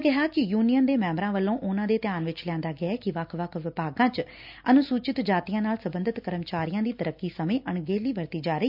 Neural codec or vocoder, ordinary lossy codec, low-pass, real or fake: none; none; 5.4 kHz; real